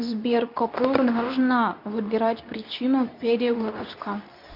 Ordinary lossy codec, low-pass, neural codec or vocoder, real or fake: AAC, 48 kbps; 5.4 kHz; codec, 24 kHz, 0.9 kbps, WavTokenizer, medium speech release version 2; fake